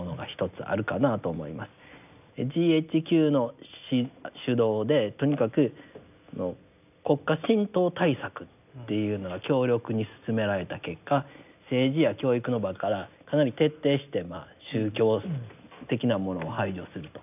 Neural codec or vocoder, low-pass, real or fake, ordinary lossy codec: none; 3.6 kHz; real; none